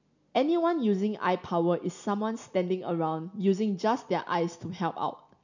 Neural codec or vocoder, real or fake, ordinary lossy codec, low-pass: none; real; none; 7.2 kHz